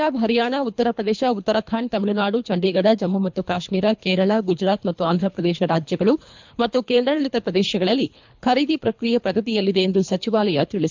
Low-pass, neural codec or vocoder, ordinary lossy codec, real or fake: 7.2 kHz; codec, 24 kHz, 3 kbps, HILCodec; MP3, 64 kbps; fake